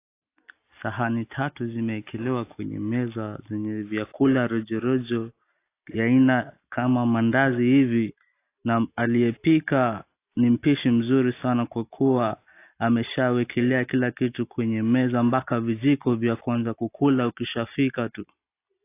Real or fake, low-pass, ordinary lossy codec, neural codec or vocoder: real; 3.6 kHz; AAC, 24 kbps; none